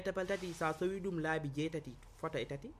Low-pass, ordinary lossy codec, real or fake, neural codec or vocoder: 14.4 kHz; MP3, 64 kbps; real; none